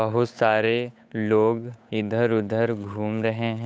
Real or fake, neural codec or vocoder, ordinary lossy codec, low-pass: fake; codec, 16 kHz, 8 kbps, FunCodec, trained on Chinese and English, 25 frames a second; none; none